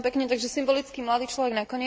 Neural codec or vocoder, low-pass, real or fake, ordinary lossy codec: none; none; real; none